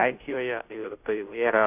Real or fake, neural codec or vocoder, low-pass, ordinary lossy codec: fake; codec, 16 kHz, 0.5 kbps, FunCodec, trained on Chinese and English, 25 frames a second; 3.6 kHz; AAC, 32 kbps